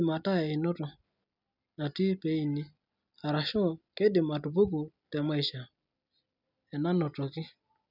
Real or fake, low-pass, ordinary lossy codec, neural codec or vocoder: real; 5.4 kHz; none; none